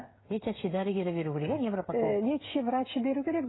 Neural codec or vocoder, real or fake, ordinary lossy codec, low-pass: codec, 16 kHz, 16 kbps, FreqCodec, smaller model; fake; AAC, 16 kbps; 7.2 kHz